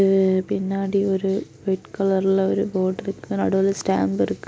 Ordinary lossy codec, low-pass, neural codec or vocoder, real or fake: none; none; none; real